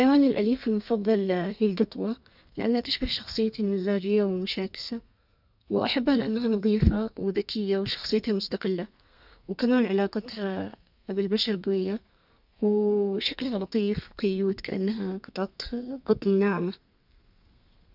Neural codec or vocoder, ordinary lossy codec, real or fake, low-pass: codec, 32 kHz, 1.9 kbps, SNAC; none; fake; 5.4 kHz